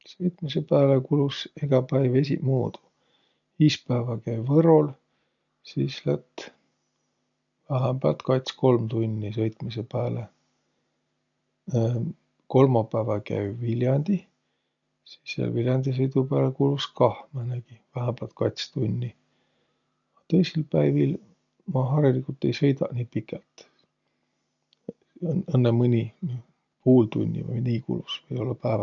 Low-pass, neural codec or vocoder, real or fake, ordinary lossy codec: 7.2 kHz; none; real; none